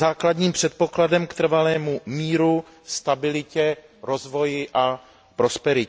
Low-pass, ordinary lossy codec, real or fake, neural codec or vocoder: none; none; real; none